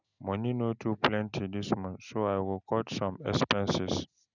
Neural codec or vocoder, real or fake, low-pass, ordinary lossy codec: none; real; 7.2 kHz; none